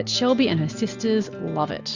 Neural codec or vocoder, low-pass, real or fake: none; 7.2 kHz; real